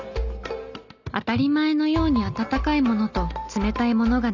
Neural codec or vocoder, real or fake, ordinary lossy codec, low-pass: none; real; none; 7.2 kHz